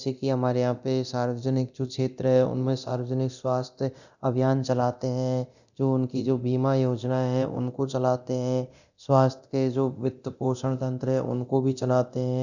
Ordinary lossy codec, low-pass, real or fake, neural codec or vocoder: none; 7.2 kHz; fake; codec, 24 kHz, 0.9 kbps, DualCodec